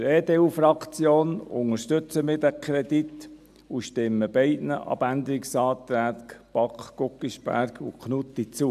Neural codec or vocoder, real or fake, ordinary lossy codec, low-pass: none; real; AAC, 96 kbps; 14.4 kHz